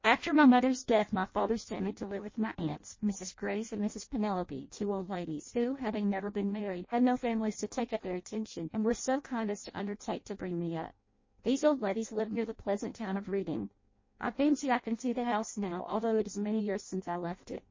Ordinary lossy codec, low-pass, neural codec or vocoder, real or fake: MP3, 32 kbps; 7.2 kHz; codec, 16 kHz in and 24 kHz out, 0.6 kbps, FireRedTTS-2 codec; fake